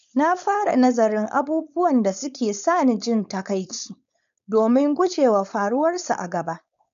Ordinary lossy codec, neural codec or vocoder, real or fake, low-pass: MP3, 96 kbps; codec, 16 kHz, 4.8 kbps, FACodec; fake; 7.2 kHz